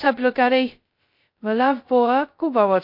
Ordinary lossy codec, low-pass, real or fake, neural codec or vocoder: MP3, 32 kbps; 5.4 kHz; fake; codec, 16 kHz, 0.2 kbps, FocalCodec